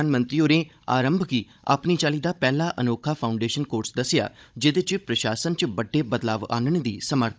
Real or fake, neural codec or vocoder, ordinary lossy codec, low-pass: fake; codec, 16 kHz, 16 kbps, FunCodec, trained on Chinese and English, 50 frames a second; none; none